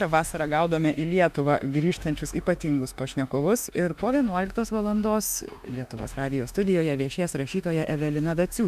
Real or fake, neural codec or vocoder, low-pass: fake; autoencoder, 48 kHz, 32 numbers a frame, DAC-VAE, trained on Japanese speech; 14.4 kHz